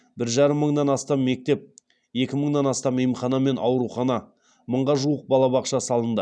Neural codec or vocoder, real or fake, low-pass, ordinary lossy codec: none; real; 9.9 kHz; none